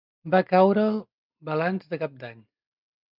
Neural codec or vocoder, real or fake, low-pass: none; real; 5.4 kHz